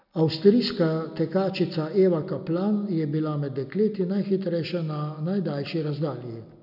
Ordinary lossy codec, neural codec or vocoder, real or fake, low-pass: none; none; real; 5.4 kHz